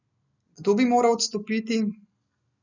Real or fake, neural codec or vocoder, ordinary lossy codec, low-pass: real; none; none; 7.2 kHz